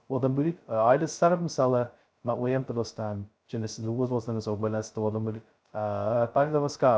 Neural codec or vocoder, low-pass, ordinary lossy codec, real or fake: codec, 16 kHz, 0.2 kbps, FocalCodec; none; none; fake